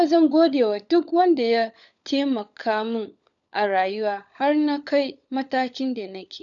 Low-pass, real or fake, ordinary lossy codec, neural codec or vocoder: 7.2 kHz; fake; none; codec, 16 kHz, 16 kbps, FunCodec, trained on LibriTTS, 50 frames a second